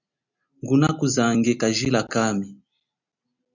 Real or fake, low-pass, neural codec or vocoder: real; 7.2 kHz; none